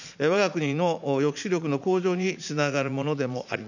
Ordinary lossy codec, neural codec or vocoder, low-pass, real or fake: none; vocoder, 44.1 kHz, 80 mel bands, Vocos; 7.2 kHz; fake